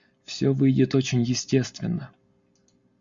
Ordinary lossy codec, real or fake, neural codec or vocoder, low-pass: Opus, 64 kbps; real; none; 7.2 kHz